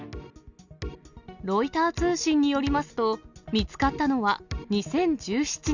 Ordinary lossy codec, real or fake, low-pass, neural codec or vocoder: none; real; 7.2 kHz; none